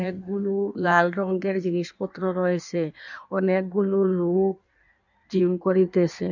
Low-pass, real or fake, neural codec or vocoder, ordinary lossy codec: 7.2 kHz; fake; codec, 16 kHz in and 24 kHz out, 1.1 kbps, FireRedTTS-2 codec; none